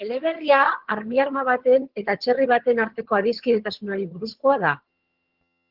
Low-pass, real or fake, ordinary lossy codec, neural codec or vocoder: 5.4 kHz; fake; Opus, 16 kbps; vocoder, 22.05 kHz, 80 mel bands, HiFi-GAN